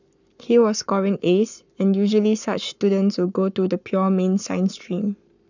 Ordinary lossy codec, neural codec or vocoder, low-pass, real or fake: none; codec, 44.1 kHz, 7.8 kbps, Pupu-Codec; 7.2 kHz; fake